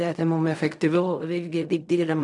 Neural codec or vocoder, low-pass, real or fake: codec, 16 kHz in and 24 kHz out, 0.4 kbps, LongCat-Audio-Codec, fine tuned four codebook decoder; 10.8 kHz; fake